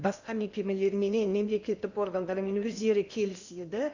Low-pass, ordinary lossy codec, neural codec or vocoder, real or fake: 7.2 kHz; none; codec, 16 kHz in and 24 kHz out, 0.8 kbps, FocalCodec, streaming, 65536 codes; fake